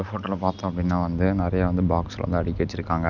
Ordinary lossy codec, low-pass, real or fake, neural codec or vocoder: none; 7.2 kHz; real; none